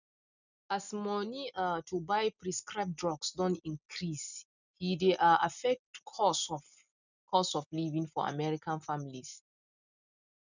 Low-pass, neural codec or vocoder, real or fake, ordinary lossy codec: 7.2 kHz; none; real; none